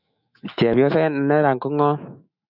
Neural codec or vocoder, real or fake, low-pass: autoencoder, 48 kHz, 128 numbers a frame, DAC-VAE, trained on Japanese speech; fake; 5.4 kHz